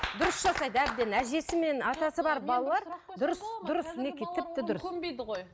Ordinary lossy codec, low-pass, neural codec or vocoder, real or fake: none; none; none; real